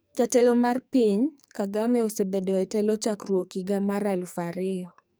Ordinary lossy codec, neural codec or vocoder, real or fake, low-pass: none; codec, 44.1 kHz, 2.6 kbps, SNAC; fake; none